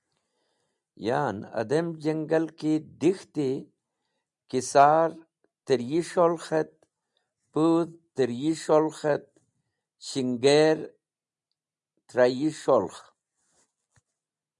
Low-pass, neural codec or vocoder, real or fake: 10.8 kHz; none; real